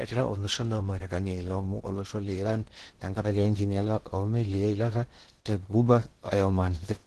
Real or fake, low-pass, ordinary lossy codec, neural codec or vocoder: fake; 10.8 kHz; Opus, 16 kbps; codec, 16 kHz in and 24 kHz out, 0.6 kbps, FocalCodec, streaming, 2048 codes